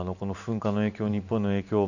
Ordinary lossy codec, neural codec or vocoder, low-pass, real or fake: none; vocoder, 44.1 kHz, 128 mel bands every 512 samples, BigVGAN v2; 7.2 kHz; fake